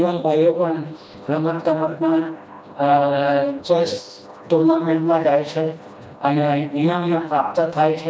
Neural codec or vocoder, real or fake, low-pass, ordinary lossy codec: codec, 16 kHz, 1 kbps, FreqCodec, smaller model; fake; none; none